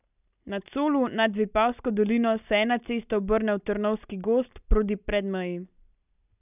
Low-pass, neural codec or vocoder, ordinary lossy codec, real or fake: 3.6 kHz; none; none; real